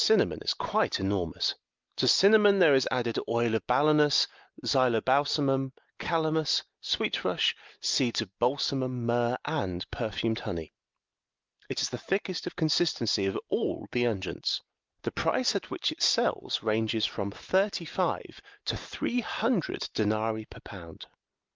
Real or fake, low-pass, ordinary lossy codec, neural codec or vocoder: real; 7.2 kHz; Opus, 32 kbps; none